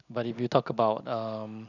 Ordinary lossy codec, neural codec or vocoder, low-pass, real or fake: none; none; 7.2 kHz; real